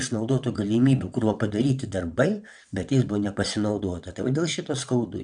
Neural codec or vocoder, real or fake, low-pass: vocoder, 22.05 kHz, 80 mel bands, WaveNeXt; fake; 9.9 kHz